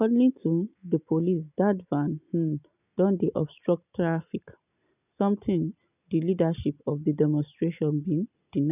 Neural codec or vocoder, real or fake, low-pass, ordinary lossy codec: none; real; 3.6 kHz; none